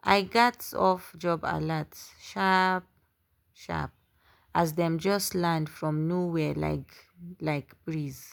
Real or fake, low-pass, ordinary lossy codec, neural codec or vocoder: real; none; none; none